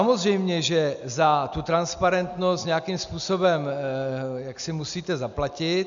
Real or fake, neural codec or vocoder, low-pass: real; none; 7.2 kHz